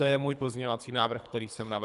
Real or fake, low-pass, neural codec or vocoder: fake; 10.8 kHz; codec, 24 kHz, 3 kbps, HILCodec